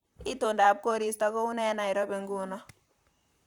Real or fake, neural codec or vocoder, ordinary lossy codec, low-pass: fake; vocoder, 44.1 kHz, 128 mel bands, Pupu-Vocoder; Opus, 64 kbps; 19.8 kHz